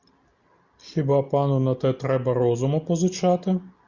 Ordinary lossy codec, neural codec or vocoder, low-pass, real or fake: Opus, 64 kbps; none; 7.2 kHz; real